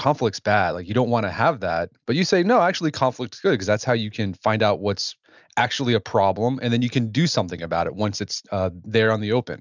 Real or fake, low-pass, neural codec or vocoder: real; 7.2 kHz; none